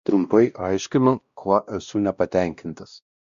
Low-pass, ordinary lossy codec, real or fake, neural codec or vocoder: 7.2 kHz; Opus, 64 kbps; fake; codec, 16 kHz, 1 kbps, X-Codec, WavLM features, trained on Multilingual LibriSpeech